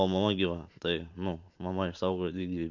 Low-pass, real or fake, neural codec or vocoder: 7.2 kHz; fake; vocoder, 44.1 kHz, 128 mel bands every 512 samples, BigVGAN v2